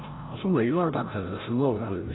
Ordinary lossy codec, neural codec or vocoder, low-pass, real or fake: AAC, 16 kbps; codec, 16 kHz, 0.5 kbps, FreqCodec, larger model; 7.2 kHz; fake